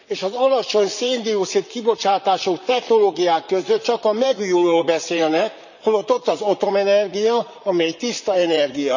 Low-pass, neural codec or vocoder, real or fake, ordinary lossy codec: 7.2 kHz; vocoder, 44.1 kHz, 128 mel bands, Pupu-Vocoder; fake; none